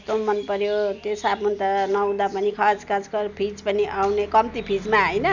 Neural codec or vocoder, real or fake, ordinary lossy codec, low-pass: none; real; none; 7.2 kHz